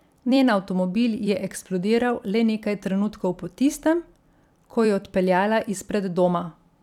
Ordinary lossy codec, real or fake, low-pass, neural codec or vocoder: none; fake; 19.8 kHz; vocoder, 44.1 kHz, 128 mel bands every 256 samples, BigVGAN v2